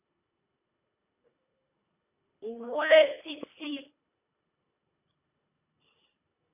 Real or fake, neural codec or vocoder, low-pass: fake; codec, 24 kHz, 1.5 kbps, HILCodec; 3.6 kHz